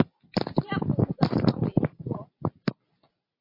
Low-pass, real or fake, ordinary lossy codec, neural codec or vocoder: 5.4 kHz; real; MP3, 32 kbps; none